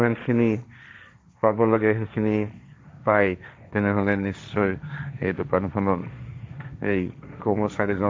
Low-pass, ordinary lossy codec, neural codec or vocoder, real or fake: none; none; codec, 16 kHz, 1.1 kbps, Voila-Tokenizer; fake